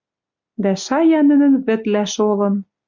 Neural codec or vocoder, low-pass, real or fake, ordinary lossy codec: none; 7.2 kHz; real; MP3, 64 kbps